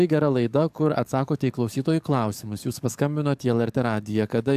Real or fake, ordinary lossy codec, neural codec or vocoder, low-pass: fake; AAC, 96 kbps; codec, 44.1 kHz, 7.8 kbps, DAC; 14.4 kHz